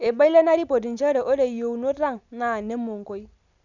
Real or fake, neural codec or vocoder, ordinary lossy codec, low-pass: real; none; none; 7.2 kHz